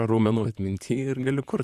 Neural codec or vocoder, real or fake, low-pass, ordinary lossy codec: vocoder, 44.1 kHz, 128 mel bands, Pupu-Vocoder; fake; 14.4 kHz; Opus, 64 kbps